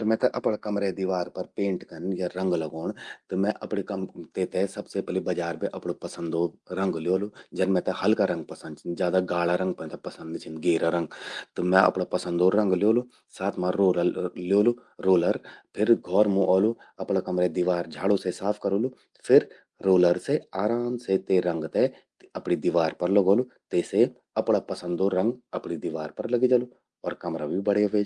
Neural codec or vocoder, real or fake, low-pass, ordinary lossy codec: none; real; 10.8 kHz; Opus, 32 kbps